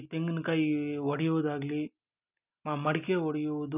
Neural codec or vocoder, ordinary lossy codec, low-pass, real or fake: none; none; 3.6 kHz; real